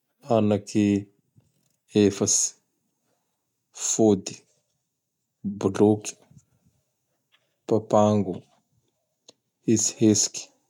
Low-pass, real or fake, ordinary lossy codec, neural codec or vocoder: 19.8 kHz; real; none; none